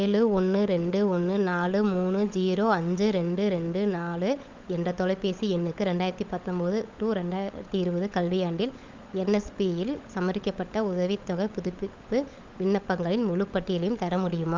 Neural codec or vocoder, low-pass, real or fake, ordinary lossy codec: codec, 16 kHz, 16 kbps, FunCodec, trained on LibriTTS, 50 frames a second; 7.2 kHz; fake; Opus, 32 kbps